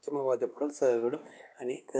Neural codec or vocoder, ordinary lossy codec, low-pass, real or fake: codec, 16 kHz, 2 kbps, X-Codec, WavLM features, trained on Multilingual LibriSpeech; none; none; fake